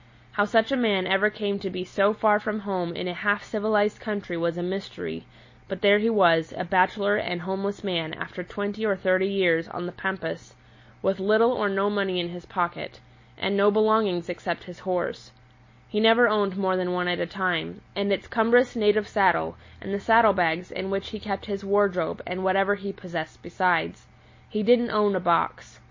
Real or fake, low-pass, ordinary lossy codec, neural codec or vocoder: real; 7.2 kHz; MP3, 32 kbps; none